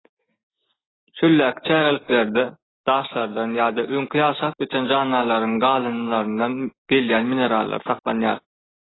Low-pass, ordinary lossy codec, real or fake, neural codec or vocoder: 7.2 kHz; AAC, 16 kbps; real; none